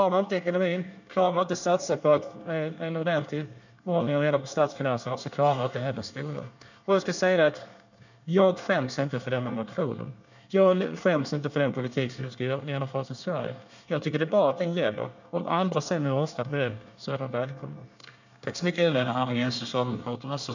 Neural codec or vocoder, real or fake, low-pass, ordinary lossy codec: codec, 24 kHz, 1 kbps, SNAC; fake; 7.2 kHz; none